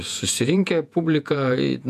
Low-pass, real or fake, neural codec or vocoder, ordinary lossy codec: 14.4 kHz; fake; vocoder, 48 kHz, 128 mel bands, Vocos; MP3, 96 kbps